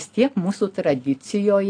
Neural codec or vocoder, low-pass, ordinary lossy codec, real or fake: none; 9.9 kHz; AAC, 48 kbps; real